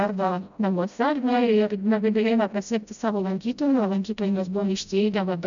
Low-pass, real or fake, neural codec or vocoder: 7.2 kHz; fake; codec, 16 kHz, 0.5 kbps, FreqCodec, smaller model